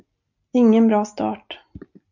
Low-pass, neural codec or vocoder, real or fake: 7.2 kHz; none; real